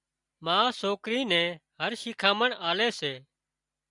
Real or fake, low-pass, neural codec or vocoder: real; 10.8 kHz; none